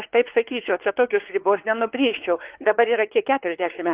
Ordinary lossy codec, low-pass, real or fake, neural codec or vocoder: Opus, 16 kbps; 3.6 kHz; fake; codec, 16 kHz, 4 kbps, X-Codec, WavLM features, trained on Multilingual LibriSpeech